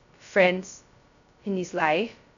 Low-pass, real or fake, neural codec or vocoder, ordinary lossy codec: 7.2 kHz; fake; codec, 16 kHz, 0.2 kbps, FocalCodec; none